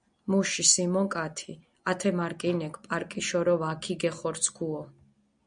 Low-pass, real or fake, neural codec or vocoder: 9.9 kHz; real; none